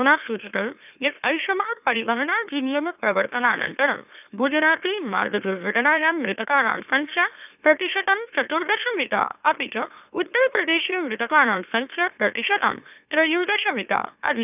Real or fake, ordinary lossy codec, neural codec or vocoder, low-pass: fake; none; autoencoder, 44.1 kHz, a latent of 192 numbers a frame, MeloTTS; 3.6 kHz